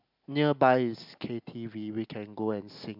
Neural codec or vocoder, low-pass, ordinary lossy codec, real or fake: none; 5.4 kHz; MP3, 48 kbps; real